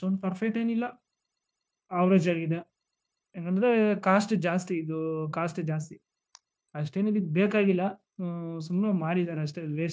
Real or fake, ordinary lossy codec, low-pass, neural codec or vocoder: fake; none; none; codec, 16 kHz, 0.9 kbps, LongCat-Audio-Codec